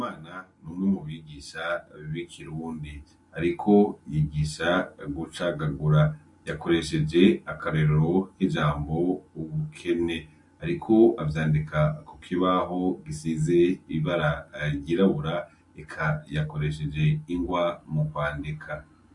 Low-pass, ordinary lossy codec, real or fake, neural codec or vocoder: 10.8 kHz; MP3, 48 kbps; real; none